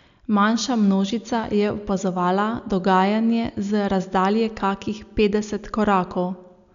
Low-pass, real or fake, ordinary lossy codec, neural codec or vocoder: 7.2 kHz; real; none; none